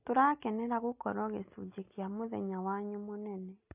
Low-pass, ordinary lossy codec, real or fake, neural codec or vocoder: 3.6 kHz; AAC, 32 kbps; real; none